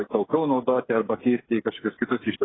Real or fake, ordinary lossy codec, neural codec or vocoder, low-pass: fake; AAC, 16 kbps; codec, 16 kHz, 8 kbps, FreqCodec, smaller model; 7.2 kHz